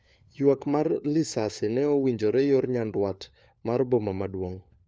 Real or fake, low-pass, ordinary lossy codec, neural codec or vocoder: fake; none; none; codec, 16 kHz, 4 kbps, FunCodec, trained on LibriTTS, 50 frames a second